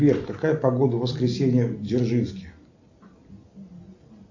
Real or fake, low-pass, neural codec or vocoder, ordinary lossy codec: real; 7.2 kHz; none; AAC, 48 kbps